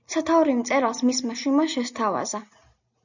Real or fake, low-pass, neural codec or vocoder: real; 7.2 kHz; none